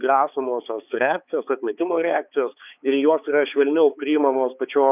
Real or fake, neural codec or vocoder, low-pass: fake; codec, 16 kHz, 4 kbps, X-Codec, HuBERT features, trained on balanced general audio; 3.6 kHz